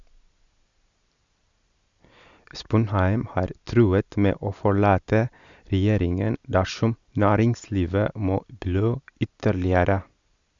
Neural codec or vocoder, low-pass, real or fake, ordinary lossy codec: none; 7.2 kHz; real; Opus, 64 kbps